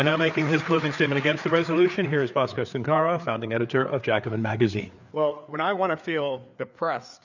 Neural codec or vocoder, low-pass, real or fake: codec, 16 kHz, 4 kbps, FreqCodec, larger model; 7.2 kHz; fake